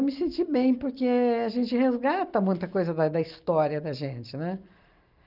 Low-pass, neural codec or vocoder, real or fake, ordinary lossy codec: 5.4 kHz; none; real; Opus, 24 kbps